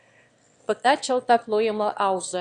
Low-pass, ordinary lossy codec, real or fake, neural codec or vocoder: 9.9 kHz; Opus, 64 kbps; fake; autoencoder, 22.05 kHz, a latent of 192 numbers a frame, VITS, trained on one speaker